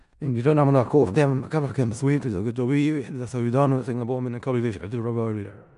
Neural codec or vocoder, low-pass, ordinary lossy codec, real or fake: codec, 16 kHz in and 24 kHz out, 0.4 kbps, LongCat-Audio-Codec, four codebook decoder; 10.8 kHz; MP3, 96 kbps; fake